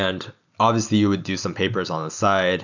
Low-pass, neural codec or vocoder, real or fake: 7.2 kHz; none; real